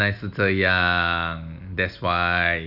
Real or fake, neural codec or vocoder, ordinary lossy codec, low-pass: real; none; none; 5.4 kHz